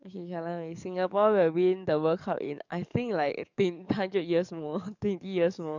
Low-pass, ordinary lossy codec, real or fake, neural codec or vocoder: 7.2 kHz; none; fake; codec, 44.1 kHz, 7.8 kbps, DAC